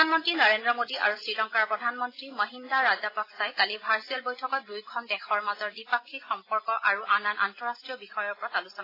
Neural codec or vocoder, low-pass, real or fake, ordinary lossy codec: none; 5.4 kHz; real; AAC, 24 kbps